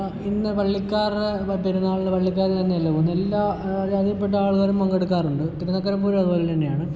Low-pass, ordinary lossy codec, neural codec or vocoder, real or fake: none; none; none; real